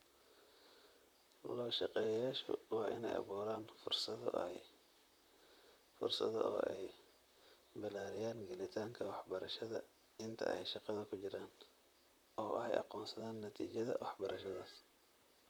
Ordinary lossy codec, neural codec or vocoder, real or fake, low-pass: none; vocoder, 44.1 kHz, 128 mel bands, Pupu-Vocoder; fake; none